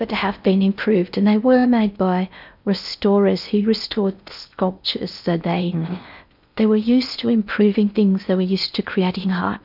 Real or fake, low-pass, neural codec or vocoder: fake; 5.4 kHz; codec, 16 kHz in and 24 kHz out, 0.6 kbps, FocalCodec, streaming, 4096 codes